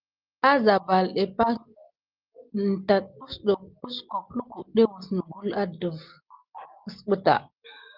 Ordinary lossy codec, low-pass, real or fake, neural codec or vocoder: Opus, 32 kbps; 5.4 kHz; real; none